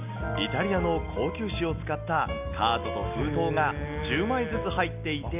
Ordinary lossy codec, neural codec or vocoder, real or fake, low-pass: none; none; real; 3.6 kHz